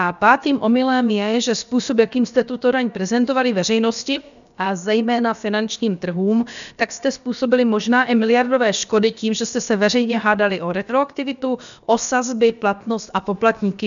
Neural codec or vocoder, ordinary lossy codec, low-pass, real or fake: codec, 16 kHz, about 1 kbps, DyCAST, with the encoder's durations; MP3, 96 kbps; 7.2 kHz; fake